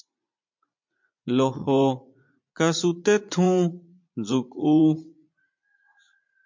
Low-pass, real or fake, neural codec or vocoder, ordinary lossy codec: 7.2 kHz; fake; vocoder, 44.1 kHz, 80 mel bands, Vocos; MP3, 48 kbps